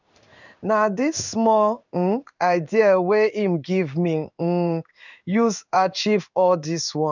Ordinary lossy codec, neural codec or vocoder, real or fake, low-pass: none; codec, 16 kHz in and 24 kHz out, 1 kbps, XY-Tokenizer; fake; 7.2 kHz